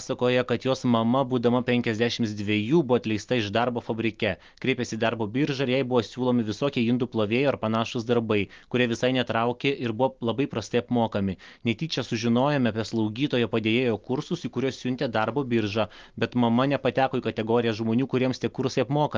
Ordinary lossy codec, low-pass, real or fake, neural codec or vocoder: Opus, 32 kbps; 7.2 kHz; real; none